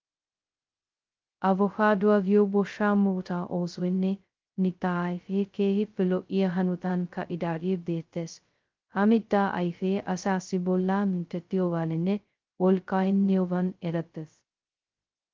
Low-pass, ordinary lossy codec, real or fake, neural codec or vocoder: 7.2 kHz; Opus, 32 kbps; fake; codec, 16 kHz, 0.2 kbps, FocalCodec